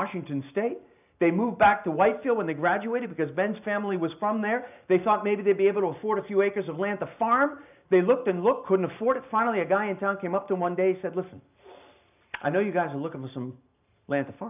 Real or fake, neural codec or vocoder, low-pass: real; none; 3.6 kHz